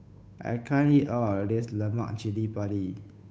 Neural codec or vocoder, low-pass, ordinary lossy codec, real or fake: codec, 16 kHz, 8 kbps, FunCodec, trained on Chinese and English, 25 frames a second; none; none; fake